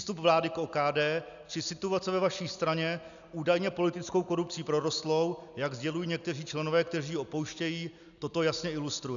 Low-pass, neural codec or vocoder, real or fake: 7.2 kHz; none; real